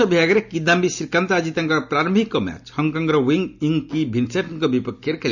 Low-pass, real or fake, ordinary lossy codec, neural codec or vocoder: 7.2 kHz; real; none; none